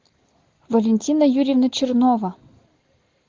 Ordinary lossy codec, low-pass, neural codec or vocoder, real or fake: Opus, 16 kbps; 7.2 kHz; none; real